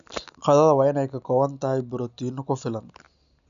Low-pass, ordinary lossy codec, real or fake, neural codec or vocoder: 7.2 kHz; none; real; none